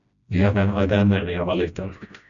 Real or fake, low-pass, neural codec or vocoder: fake; 7.2 kHz; codec, 16 kHz, 1 kbps, FreqCodec, smaller model